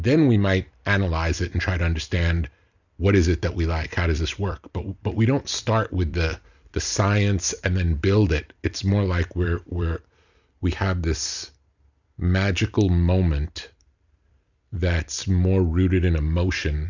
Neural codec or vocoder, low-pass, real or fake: vocoder, 44.1 kHz, 128 mel bands every 256 samples, BigVGAN v2; 7.2 kHz; fake